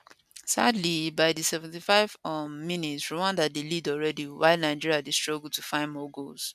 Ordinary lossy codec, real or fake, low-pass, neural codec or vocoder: none; real; 14.4 kHz; none